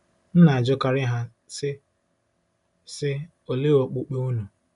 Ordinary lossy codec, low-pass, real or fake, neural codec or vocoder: none; 10.8 kHz; real; none